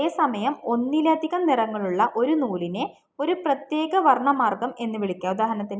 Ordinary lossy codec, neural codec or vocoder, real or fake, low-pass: none; none; real; none